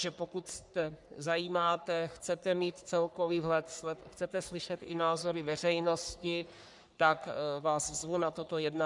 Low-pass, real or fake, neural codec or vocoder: 10.8 kHz; fake; codec, 44.1 kHz, 3.4 kbps, Pupu-Codec